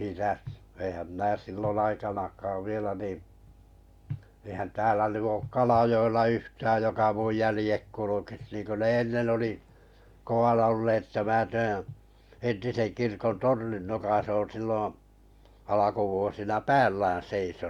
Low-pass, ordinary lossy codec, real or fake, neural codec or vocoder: 19.8 kHz; Opus, 64 kbps; real; none